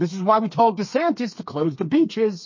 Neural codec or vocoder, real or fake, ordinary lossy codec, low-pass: codec, 32 kHz, 1.9 kbps, SNAC; fake; MP3, 32 kbps; 7.2 kHz